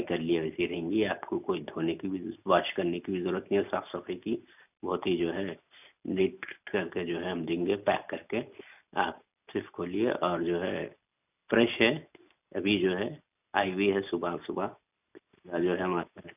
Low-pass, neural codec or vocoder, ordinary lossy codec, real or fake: 3.6 kHz; none; none; real